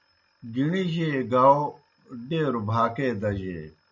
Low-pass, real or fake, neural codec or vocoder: 7.2 kHz; real; none